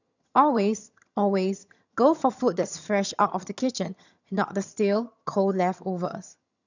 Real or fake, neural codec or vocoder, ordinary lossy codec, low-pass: fake; vocoder, 22.05 kHz, 80 mel bands, HiFi-GAN; none; 7.2 kHz